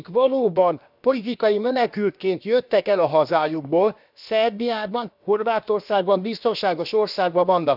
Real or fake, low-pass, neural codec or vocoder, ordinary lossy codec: fake; 5.4 kHz; codec, 16 kHz, about 1 kbps, DyCAST, with the encoder's durations; none